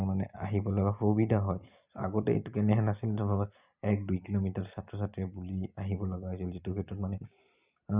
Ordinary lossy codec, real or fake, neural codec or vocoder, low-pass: Opus, 64 kbps; real; none; 3.6 kHz